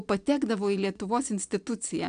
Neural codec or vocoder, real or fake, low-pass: none; real; 9.9 kHz